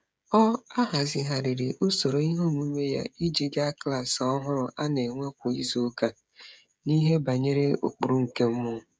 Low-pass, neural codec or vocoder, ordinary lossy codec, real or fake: none; codec, 16 kHz, 16 kbps, FreqCodec, smaller model; none; fake